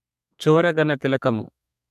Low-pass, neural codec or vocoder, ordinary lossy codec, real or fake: 14.4 kHz; codec, 32 kHz, 1.9 kbps, SNAC; MP3, 96 kbps; fake